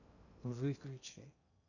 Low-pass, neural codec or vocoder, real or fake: 7.2 kHz; codec, 16 kHz in and 24 kHz out, 0.8 kbps, FocalCodec, streaming, 65536 codes; fake